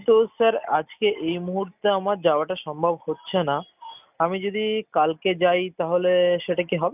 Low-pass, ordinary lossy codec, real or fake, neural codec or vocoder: 3.6 kHz; none; real; none